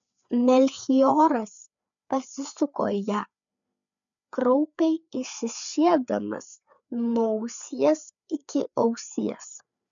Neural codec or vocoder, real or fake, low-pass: codec, 16 kHz, 4 kbps, FreqCodec, larger model; fake; 7.2 kHz